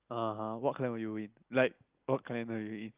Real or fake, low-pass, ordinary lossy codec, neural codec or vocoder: real; 3.6 kHz; Opus, 24 kbps; none